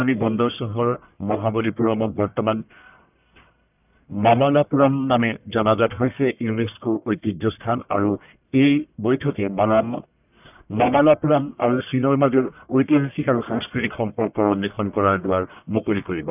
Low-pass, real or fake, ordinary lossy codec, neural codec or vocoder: 3.6 kHz; fake; none; codec, 44.1 kHz, 1.7 kbps, Pupu-Codec